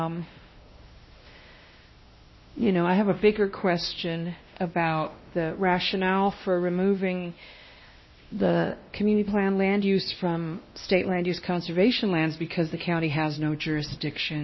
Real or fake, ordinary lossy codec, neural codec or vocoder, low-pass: fake; MP3, 24 kbps; codec, 16 kHz, 1 kbps, X-Codec, WavLM features, trained on Multilingual LibriSpeech; 7.2 kHz